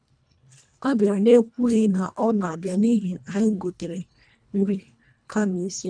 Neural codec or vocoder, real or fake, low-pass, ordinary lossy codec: codec, 24 kHz, 1.5 kbps, HILCodec; fake; 9.9 kHz; none